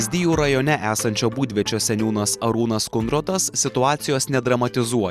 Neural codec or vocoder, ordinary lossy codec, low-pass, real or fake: none; Opus, 64 kbps; 14.4 kHz; real